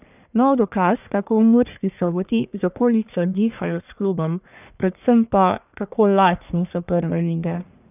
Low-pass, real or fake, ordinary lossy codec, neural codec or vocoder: 3.6 kHz; fake; none; codec, 44.1 kHz, 1.7 kbps, Pupu-Codec